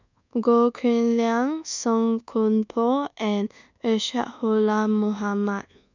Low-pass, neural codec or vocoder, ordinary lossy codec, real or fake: 7.2 kHz; codec, 24 kHz, 1.2 kbps, DualCodec; none; fake